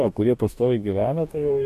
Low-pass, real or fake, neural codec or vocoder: 14.4 kHz; fake; codec, 44.1 kHz, 2.6 kbps, DAC